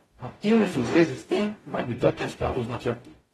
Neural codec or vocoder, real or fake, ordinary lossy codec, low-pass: codec, 44.1 kHz, 0.9 kbps, DAC; fake; AAC, 32 kbps; 19.8 kHz